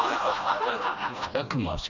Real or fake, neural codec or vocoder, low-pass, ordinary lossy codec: fake; codec, 16 kHz, 1 kbps, FreqCodec, smaller model; 7.2 kHz; none